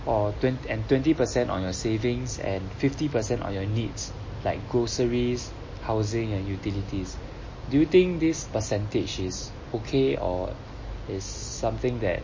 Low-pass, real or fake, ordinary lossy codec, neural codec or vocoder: 7.2 kHz; real; MP3, 32 kbps; none